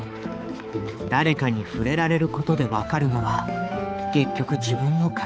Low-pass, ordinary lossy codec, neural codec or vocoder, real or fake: none; none; codec, 16 kHz, 4 kbps, X-Codec, HuBERT features, trained on balanced general audio; fake